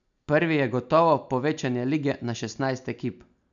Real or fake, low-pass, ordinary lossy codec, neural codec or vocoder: real; 7.2 kHz; none; none